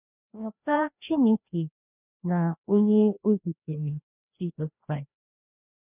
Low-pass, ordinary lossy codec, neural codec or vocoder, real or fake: 3.6 kHz; none; codec, 16 kHz, 1 kbps, FreqCodec, larger model; fake